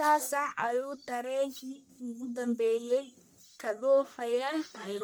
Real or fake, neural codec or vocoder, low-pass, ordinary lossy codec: fake; codec, 44.1 kHz, 1.7 kbps, Pupu-Codec; none; none